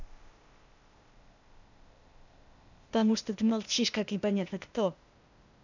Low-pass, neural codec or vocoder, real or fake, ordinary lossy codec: 7.2 kHz; codec, 16 kHz, 0.8 kbps, ZipCodec; fake; none